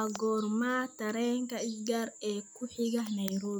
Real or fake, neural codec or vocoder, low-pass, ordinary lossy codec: real; none; none; none